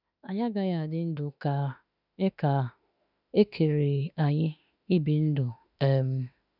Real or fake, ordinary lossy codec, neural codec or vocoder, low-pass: fake; none; autoencoder, 48 kHz, 32 numbers a frame, DAC-VAE, trained on Japanese speech; 5.4 kHz